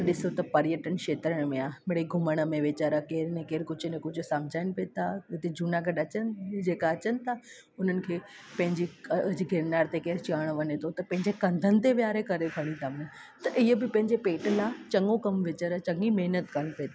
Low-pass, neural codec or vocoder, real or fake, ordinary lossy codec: none; none; real; none